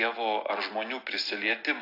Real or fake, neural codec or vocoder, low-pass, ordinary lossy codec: real; none; 5.4 kHz; AAC, 32 kbps